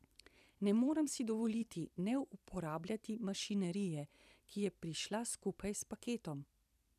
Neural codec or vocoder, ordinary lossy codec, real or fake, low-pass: vocoder, 44.1 kHz, 128 mel bands every 256 samples, BigVGAN v2; none; fake; 14.4 kHz